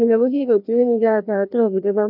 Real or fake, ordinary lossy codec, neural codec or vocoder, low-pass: fake; none; codec, 16 kHz, 1 kbps, FreqCodec, larger model; 5.4 kHz